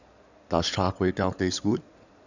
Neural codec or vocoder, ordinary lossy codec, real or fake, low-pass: codec, 16 kHz in and 24 kHz out, 2.2 kbps, FireRedTTS-2 codec; none; fake; 7.2 kHz